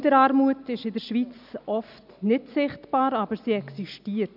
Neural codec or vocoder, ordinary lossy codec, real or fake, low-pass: none; none; real; 5.4 kHz